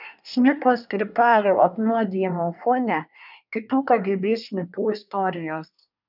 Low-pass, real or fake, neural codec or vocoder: 5.4 kHz; fake; codec, 24 kHz, 1 kbps, SNAC